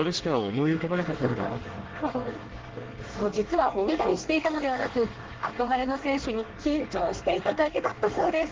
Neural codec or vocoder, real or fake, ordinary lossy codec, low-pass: codec, 24 kHz, 1 kbps, SNAC; fake; Opus, 16 kbps; 7.2 kHz